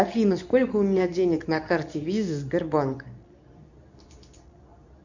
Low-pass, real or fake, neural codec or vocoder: 7.2 kHz; fake; codec, 24 kHz, 0.9 kbps, WavTokenizer, medium speech release version 2